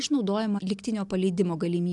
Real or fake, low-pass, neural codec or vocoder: real; 10.8 kHz; none